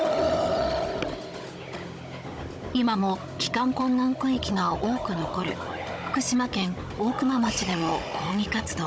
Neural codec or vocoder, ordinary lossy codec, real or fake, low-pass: codec, 16 kHz, 16 kbps, FunCodec, trained on Chinese and English, 50 frames a second; none; fake; none